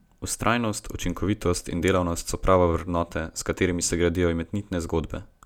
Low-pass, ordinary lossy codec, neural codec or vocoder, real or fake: 19.8 kHz; none; none; real